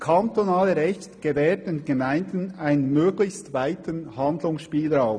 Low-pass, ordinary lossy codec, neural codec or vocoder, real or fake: 9.9 kHz; none; none; real